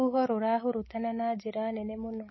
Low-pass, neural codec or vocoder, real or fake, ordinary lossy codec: 7.2 kHz; none; real; MP3, 24 kbps